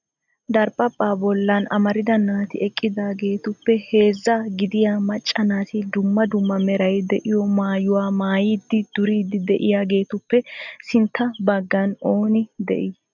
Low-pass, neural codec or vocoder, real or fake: 7.2 kHz; none; real